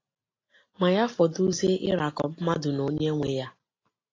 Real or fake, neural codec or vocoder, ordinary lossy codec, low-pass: real; none; AAC, 32 kbps; 7.2 kHz